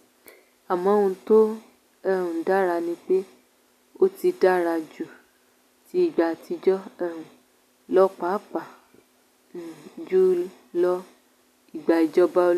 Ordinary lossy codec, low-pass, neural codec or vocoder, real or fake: none; 14.4 kHz; none; real